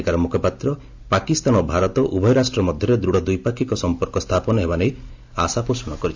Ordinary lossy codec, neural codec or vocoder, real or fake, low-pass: MP3, 64 kbps; none; real; 7.2 kHz